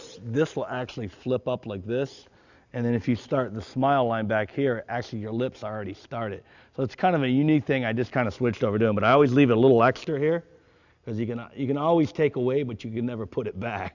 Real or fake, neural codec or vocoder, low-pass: real; none; 7.2 kHz